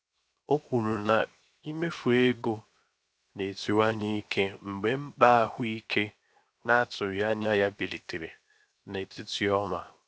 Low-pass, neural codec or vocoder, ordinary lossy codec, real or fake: none; codec, 16 kHz, 0.7 kbps, FocalCodec; none; fake